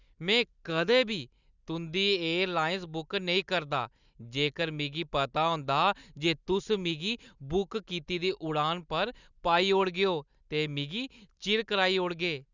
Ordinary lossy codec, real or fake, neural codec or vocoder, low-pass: Opus, 64 kbps; real; none; 7.2 kHz